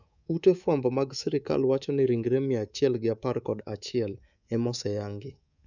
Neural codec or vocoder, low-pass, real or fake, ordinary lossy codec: codec, 24 kHz, 3.1 kbps, DualCodec; 7.2 kHz; fake; none